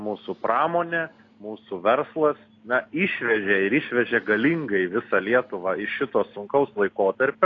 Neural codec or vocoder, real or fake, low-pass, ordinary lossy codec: none; real; 7.2 kHz; AAC, 32 kbps